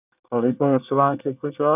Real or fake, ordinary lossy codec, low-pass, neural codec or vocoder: fake; none; 3.6 kHz; codec, 24 kHz, 1 kbps, SNAC